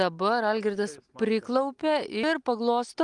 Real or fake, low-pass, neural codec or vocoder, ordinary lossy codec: real; 10.8 kHz; none; Opus, 32 kbps